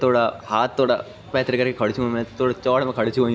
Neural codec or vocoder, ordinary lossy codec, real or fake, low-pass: none; none; real; none